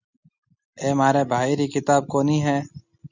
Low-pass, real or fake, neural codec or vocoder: 7.2 kHz; real; none